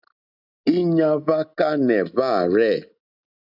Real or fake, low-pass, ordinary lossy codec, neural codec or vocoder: real; 5.4 kHz; AAC, 48 kbps; none